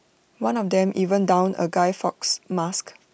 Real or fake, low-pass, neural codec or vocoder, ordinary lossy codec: real; none; none; none